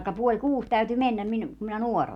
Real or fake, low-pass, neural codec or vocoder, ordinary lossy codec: real; 19.8 kHz; none; none